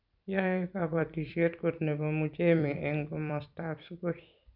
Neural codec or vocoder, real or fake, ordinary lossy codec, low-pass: vocoder, 44.1 kHz, 128 mel bands every 256 samples, BigVGAN v2; fake; none; 5.4 kHz